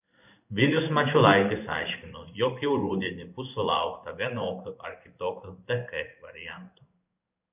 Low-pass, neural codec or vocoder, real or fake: 3.6 kHz; codec, 16 kHz in and 24 kHz out, 1 kbps, XY-Tokenizer; fake